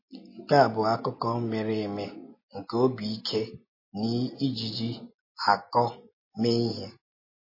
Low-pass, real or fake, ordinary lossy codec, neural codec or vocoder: 5.4 kHz; real; MP3, 24 kbps; none